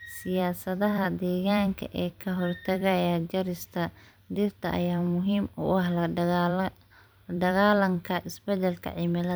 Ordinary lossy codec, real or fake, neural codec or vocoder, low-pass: none; fake; vocoder, 44.1 kHz, 128 mel bands every 256 samples, BigVGAN v2; none